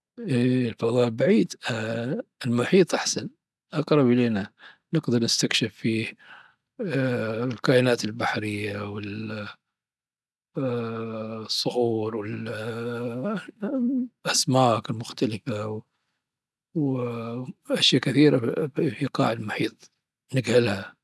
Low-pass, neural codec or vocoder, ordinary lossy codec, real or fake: none; none; none; real